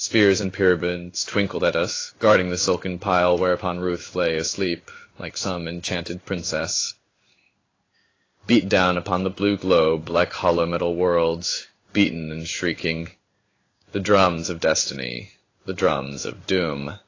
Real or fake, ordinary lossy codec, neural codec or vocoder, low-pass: fake; AAC, 32 kbps; codec, 16 kHz in and 24 kHz out, 1 kbps, XY-Tokenizer; 7.2 kHz